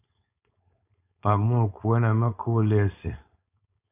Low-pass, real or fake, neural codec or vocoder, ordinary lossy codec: 3.6 kHz; fake; codec, 16 kHz, 4.8 kbps, FACodec; AAC, 24 kbps